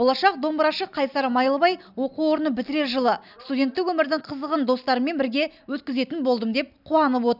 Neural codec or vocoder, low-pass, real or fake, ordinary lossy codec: none; 5.4 kHz; real; none